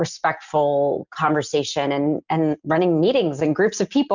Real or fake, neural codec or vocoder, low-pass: real; none; 7.2 kHz